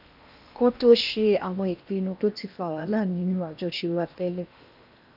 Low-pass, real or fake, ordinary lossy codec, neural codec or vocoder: 5.4 kHz; fake; none; codec, 16 kHz in and 24 kHz out, 0.8 kbps, FocalCodec, streaming, 65536 codes